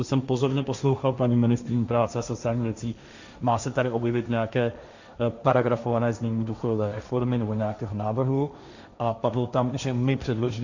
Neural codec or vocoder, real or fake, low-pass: codec, 16 kHz, 1.1 kbps, Voila-Tokenizer; fake; 7.2 kHz